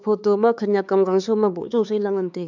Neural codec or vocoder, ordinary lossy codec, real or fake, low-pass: codec, 16 kHz, 4 kbps, X-Codec, HuBERT features, trained on LibriSpeech; none; fake; 7.2 kHz